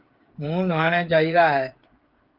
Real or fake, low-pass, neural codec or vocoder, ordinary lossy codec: fake; 5.4 kHz; codec, 16 kHz, 8 kbps, FreqCodec, smaller model; Opus, 32 kbps